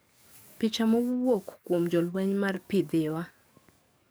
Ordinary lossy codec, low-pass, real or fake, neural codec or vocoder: none; none; fake; codec, 44.1 kHz, 7.8 kbps, DAC